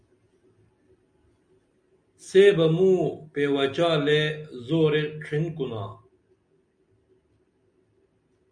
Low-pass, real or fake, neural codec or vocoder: 9.9 kHz; real; none